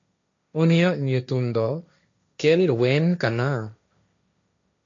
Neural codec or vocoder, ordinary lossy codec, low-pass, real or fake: codec, 16 kHz, 1.1 kbps, Voila-Tokenizer; MP3, 64 kbps; 7.2 kHz; fake